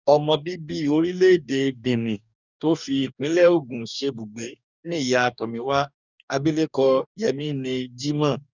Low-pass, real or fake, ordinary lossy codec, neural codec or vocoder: 7.2 kHz; fake; none; codec, 44.1 kHz, 2.6 kbps, DAC